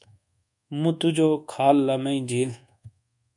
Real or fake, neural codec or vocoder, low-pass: fake; codec, 24 kHz, 1.2 kbps, DualCodec; 10.8 kHz